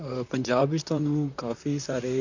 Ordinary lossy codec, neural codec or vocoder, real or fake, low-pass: none; vocoder, 44.1 kHz, 128 mel bands, Pupu-Vocoder; fake; 7.2 kHz